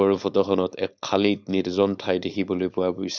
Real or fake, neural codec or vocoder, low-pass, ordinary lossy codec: fake; codec, 16 kHz, 4.8 kbps, FACodec; 7.2 kHz; none